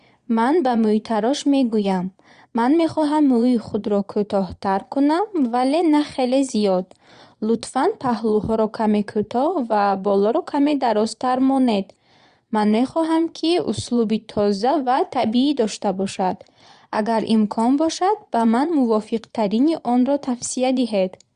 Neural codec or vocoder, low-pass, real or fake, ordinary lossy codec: vocoder, 22.05 kHz, 80 mel bands, Vocos; 9.9 kHz; fake; Opus, 64 kbps